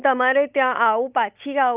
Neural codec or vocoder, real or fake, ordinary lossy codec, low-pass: none; real; Opus, 24 kbps; 3.6 kHz